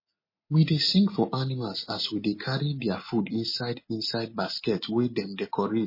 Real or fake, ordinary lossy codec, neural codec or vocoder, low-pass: real; MP3, 24 kbps; none; 5.4 kHz